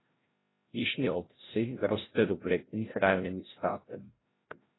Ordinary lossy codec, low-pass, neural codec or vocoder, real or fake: AAC, 16 kbps; 7.2 kHz; codec, 16 kHz, 0.5 kbps, FreqCodec, larger model; fake